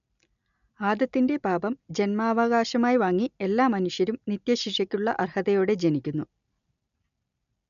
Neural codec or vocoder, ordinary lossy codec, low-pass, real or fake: none; none; 7.2 kHz; real